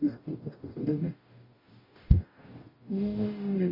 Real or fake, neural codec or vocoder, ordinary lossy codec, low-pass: fake; codec, 44.1 kHz, 0.9 kbps, DAC; MP3, 24 kbps; 5.4 kHz